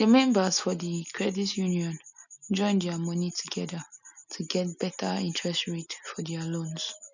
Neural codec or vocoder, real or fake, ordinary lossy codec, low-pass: none; real; none; 7.2 kHz